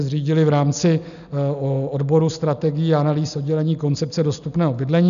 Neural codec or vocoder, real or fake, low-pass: none; real; 7.2 kHz